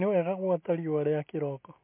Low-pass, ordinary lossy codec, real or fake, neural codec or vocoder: 3.6 kHz; MP3, 24 kbps; real; none